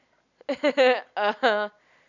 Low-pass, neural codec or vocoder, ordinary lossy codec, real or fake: 7.2 kHz; none; none; real